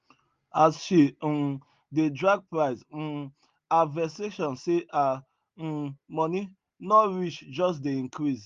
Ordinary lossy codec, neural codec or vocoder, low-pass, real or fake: Opus, 32 kbps; none; 7.2 kHz; real